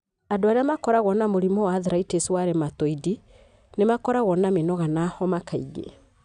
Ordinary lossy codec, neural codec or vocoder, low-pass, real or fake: none; none; 9.9 kHz; real